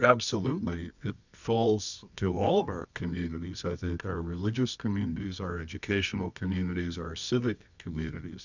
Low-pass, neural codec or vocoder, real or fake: 7.2 kHz; codec, 24 kHz, 0.9 kbps, WavTokenizer, medium music audio release; fake